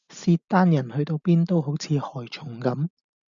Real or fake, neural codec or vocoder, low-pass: real; none; 7.2 kHz